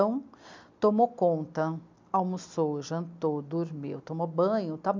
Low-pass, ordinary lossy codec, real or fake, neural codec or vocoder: 7.2 kHz; MP3, 64 kbps; real; none